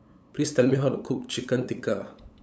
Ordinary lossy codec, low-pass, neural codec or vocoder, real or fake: none; none; codec, 16 kHz, 8 kbps, FunCodec, trained on LibriTTS, 25 frames a second; fake